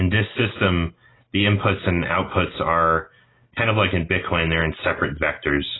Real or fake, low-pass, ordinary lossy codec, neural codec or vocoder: real; 7.2 kHz; AAC, 16 kbps; none